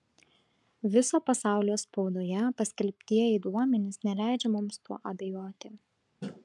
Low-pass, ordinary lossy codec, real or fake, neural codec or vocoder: 10.8 kHz; MP3, 96 kbps; fake; codec, 44.1 kHz, 7.8 kbps, Pupu-Codec